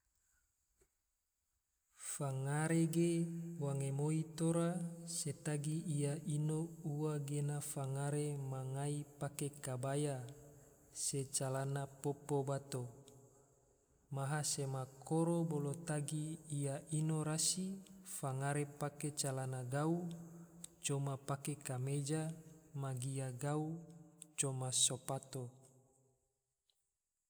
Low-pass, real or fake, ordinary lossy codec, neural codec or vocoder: none; real; none; none